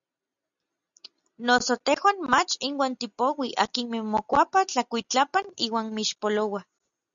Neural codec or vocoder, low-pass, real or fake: none; 7.2 kHz; real